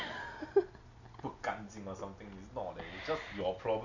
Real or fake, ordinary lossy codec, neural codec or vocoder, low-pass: real; none; none; 7.2 kHz